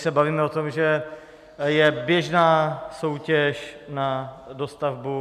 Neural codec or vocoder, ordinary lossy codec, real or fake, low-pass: none; MP3, 96 kbps; real; 14.4 kHz